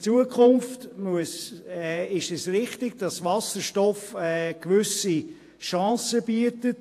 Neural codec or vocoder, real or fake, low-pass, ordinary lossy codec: vocoder, 48 kHz, 128 mel bands, Vocos; fake; 14.4 kHz; AAC, 64 kbps